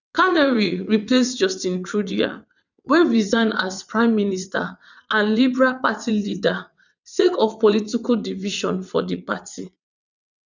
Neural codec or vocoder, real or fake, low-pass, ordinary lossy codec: vocoder, 22.05 kHz, 80 mel bands, WaveNeXt; fake; 7.2 kHz; none